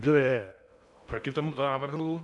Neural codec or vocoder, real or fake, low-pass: codec, 16 kHz in and 24 kHz out, 0.6 kbps, FocalCodec, streaming, 2048 codes; fake; 10.8 kHz